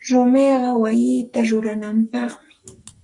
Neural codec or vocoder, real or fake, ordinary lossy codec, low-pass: codec, 44.1 kHz, 2.6 kbps, SNAC; fake; Opus, 64 kbps; 10.8 kHz